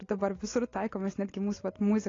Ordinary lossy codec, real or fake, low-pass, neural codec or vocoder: AAC, 32 kbps; real; 7.2 kHz; none